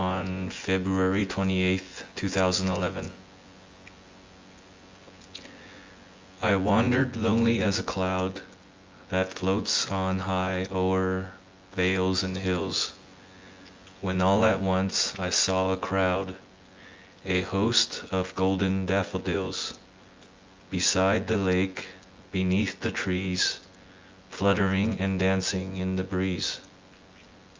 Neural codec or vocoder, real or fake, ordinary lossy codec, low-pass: vocoder, 24 kHz, 100 mel bands, Vocos; fake; Opus, 32 kbps; 7.2 kHz